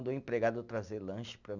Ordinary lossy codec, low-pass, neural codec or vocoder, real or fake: none; 7.2 kHz; none; real